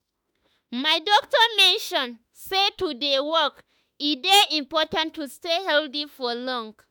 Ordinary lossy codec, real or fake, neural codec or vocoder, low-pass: none; fake; autoencoder, 48 kHz, 32 numbers a frame, DAC-VAE, trained on Japanese speech; none